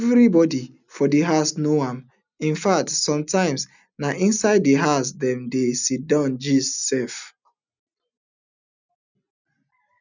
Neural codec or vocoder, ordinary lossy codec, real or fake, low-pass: none; none; real; 7.2 kHz